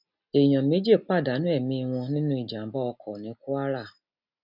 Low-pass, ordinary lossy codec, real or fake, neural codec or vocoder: 5.4 kHz; none; real; none